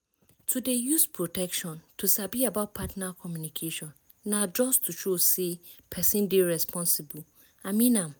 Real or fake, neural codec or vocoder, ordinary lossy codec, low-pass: real; none; none; none